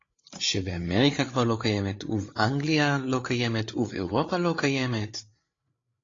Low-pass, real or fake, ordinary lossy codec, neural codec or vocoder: 7.2 kHz; fake; AAC, 32 kbps; codec, 16 kHz, 8 kbps, FreqCodec, larger model